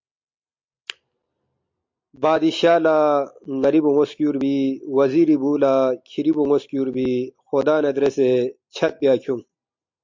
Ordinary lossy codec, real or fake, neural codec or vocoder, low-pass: MP3, 48 kbps; real; none; 7.2 kHz